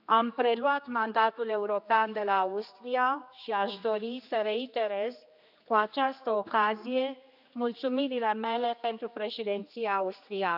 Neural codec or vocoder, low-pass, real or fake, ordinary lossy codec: codec, 16 kHz, 2 kbps, X-Codec, HuBERT features, trained on general audio; 5.4 kHz; fake; none